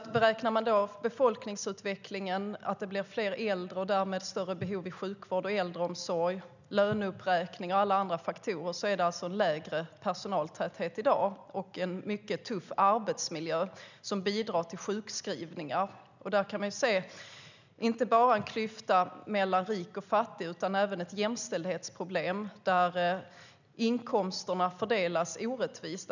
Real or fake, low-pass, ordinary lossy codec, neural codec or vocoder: real; 7.2 kHz; none; none